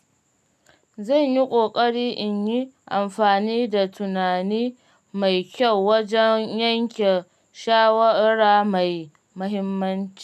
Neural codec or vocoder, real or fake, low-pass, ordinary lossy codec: none; real; 14.4 kHz; none